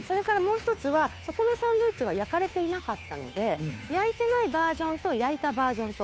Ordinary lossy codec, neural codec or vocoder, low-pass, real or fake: none; codec, 16 kHz, 2 kbps, FunCodec, trained on Chinese and English, 25 frames a second; none; fake